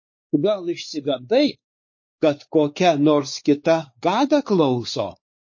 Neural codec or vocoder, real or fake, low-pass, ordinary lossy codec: codec, 16 kHz, 4 kbps, X-Codec, WavLM features, trained on Multilingual LibriSpeech; fake; 7.2 kHz; MP3, 32 kbps